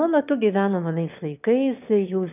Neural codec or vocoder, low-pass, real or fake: autoencoder, 22.05 kHz, a latent of 192 numbers a frame, VITS, trained on one speaker; 3.6 kHz; fake